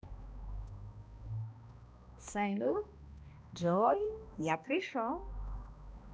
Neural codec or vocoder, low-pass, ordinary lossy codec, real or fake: codec, 16 kHz, 1 kbps, X-Codec, HuBERT features, trained on balanced general audio; none; none; fake